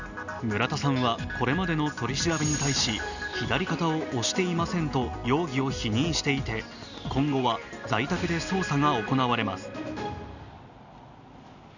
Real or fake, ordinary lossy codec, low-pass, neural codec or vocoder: real; none; 7.2 kHz; none